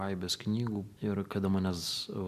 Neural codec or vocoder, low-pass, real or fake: none; 14.4 kHz; real